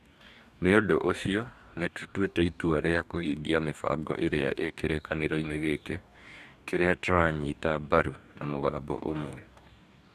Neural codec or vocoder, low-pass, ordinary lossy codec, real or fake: codec, 44.1 kHz, 2.6 kbps, DAC; 14.4 kHz; none; fake